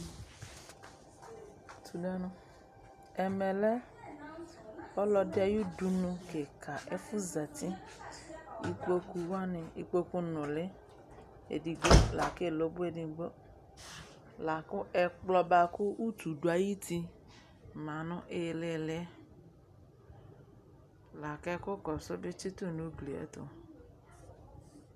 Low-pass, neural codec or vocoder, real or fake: 14.4 kHz; none; real